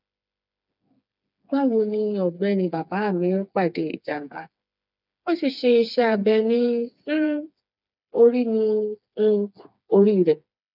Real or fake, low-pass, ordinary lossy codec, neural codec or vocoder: fake; 5.4 kHz; none; codec, 16 kHz, 4 kbps, FreqCodec, smaller model